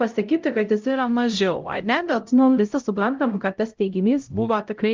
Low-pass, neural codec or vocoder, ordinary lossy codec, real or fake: 7.2 kHz; codec, 16 kHz, 0.5 kbps, X-Codec, HuBERT features, trained on LibriSpeech; Opus, 32 kbps; fake